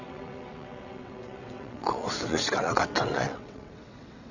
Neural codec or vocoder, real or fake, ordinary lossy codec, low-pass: vocoder, 22.05 kHz, 80 mel bands, WaveNeXt; fake; none; 7.2 kHz